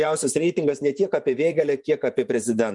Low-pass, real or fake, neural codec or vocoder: 10.8 kHz; fake; vocoder, 24 kHz, 100 mel bands, Vocos